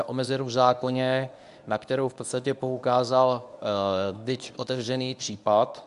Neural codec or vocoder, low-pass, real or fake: codec, 24 kHz, 0.9 kbps, WavTokenizer, medium speech release version 1; 10.8 kHz; fake